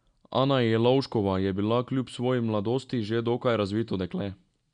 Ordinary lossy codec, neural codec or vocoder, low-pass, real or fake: none; none; 9.9 kHz; real